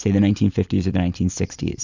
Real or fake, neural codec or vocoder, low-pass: fake; vocoder, 44.1 kHz, 128 mel bands every 256 samples, BigVGAN v2; 7.2 kHz